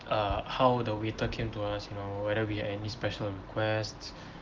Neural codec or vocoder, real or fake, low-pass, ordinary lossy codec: none; real; 7.2 kHz; Opus, 16 kbps